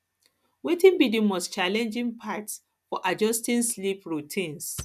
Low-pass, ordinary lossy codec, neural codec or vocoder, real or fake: 14.4 kHz; none; none; real